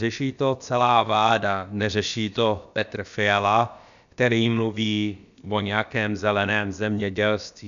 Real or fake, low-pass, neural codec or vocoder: fake; 7.2 kHz; codec, 16 kHz, about 1 kbps, DyCAST, with the encoder's durations